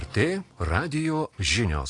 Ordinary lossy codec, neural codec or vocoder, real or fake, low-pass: AAC, 48 kbps; none; real; 10.8 kHz